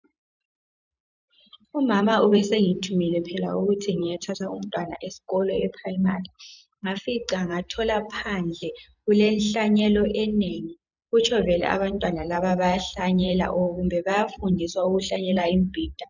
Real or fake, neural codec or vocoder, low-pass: fake; vocoder, 44.1 kHz, 128 mel bands every 512 samples, BigVGAN v2; 7.2 kHz